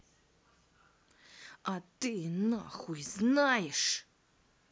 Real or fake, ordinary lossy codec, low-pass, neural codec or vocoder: real; none; none; none